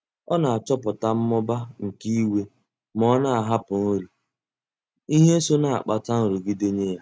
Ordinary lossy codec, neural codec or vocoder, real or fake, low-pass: none; none; real; none